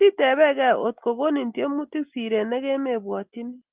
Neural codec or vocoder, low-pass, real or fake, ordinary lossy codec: none; 3.6 kHz; real; Opus, 32 kbps